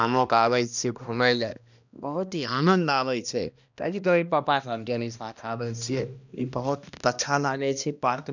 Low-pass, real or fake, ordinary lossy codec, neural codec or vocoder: 7.2 kHz; fake; none; codec, 16 kHz, 1 kbps, X-Codec, HuBERT features, trained on balanced general audio